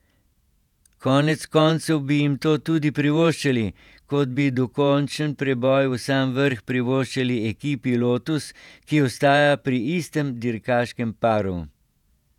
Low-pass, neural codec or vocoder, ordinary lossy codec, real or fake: 19.8 kHz; vocoder, 48 kHz, 128 mel bands, Vocos; none; fake